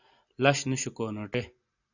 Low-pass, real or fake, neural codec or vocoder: 7.2 kHz; real; none